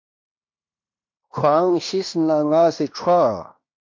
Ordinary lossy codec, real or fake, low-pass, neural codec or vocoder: MP3, 48 kbps; fake; 7.2 kHz; codec, 16 kHz in and 24 kHz out, 0.9 kbps, LongCat-Audio-Codec, fine tuned four codebook decoder